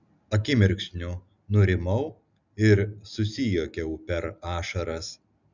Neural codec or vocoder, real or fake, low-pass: none; real; 7.2 kHz